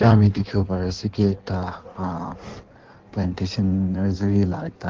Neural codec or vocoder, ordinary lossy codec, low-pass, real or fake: codec, 16 kHz in and 24 kHz out, 1.1 kbps, FireRedTTS-2 codec; Opus, 16 kbps; 7.2 kHz; fake